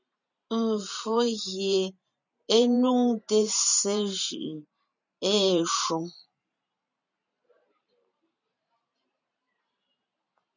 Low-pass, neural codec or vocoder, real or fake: 7.2 kHz; vocoder, 44.1 kHz, 128 mel bands every 512 samples, BigVGAN v2; fake